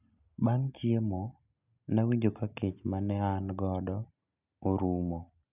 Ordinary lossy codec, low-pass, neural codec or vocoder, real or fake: none; 3.6 kHz; none; real